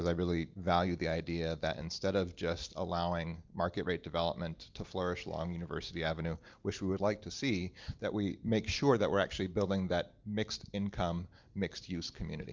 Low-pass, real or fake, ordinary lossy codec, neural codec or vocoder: 7.2 kHz; real; Opus, 24 kbps; none